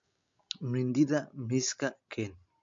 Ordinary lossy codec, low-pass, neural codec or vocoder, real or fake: MP3, 48 kbps; 7.2 kHz; none; real